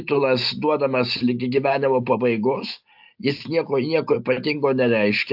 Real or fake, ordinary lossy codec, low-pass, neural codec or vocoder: real; AAC, 48 kbps; 5.4 kHz; none